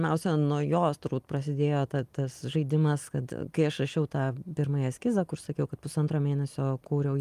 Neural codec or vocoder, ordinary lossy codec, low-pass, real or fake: none; Opus, 32 kbps; 14.4 kHz; real